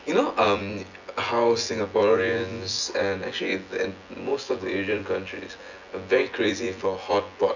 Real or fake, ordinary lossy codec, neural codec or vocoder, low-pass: fake; none; vocoder, 24 kHz, 100 mel bands, Vocos; 7.2 kHz